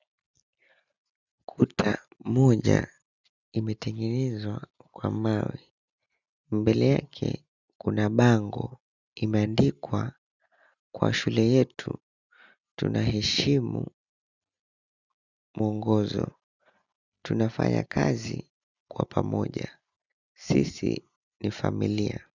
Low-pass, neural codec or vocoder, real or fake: 7.2 kHz; none; real